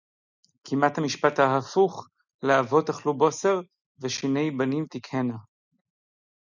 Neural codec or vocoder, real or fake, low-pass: none; real; 7.2 kHz